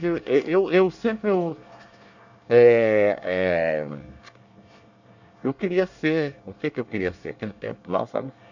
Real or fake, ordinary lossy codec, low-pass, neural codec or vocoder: fake; none; 7.2 kHz; codec, 24 kHz, 1 kbps, SNAC